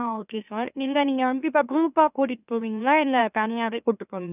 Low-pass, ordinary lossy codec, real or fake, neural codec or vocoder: 3.6 kHz; none; fake; autoencoder, 44.1 kHz, a latent of 192 numbers a frame, MeloTTS